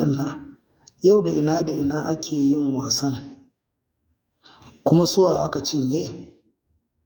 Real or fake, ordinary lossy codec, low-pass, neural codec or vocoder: fake; none; 19.8 kHz; codec, 44.1 kHz, 2.6 kbps, DAC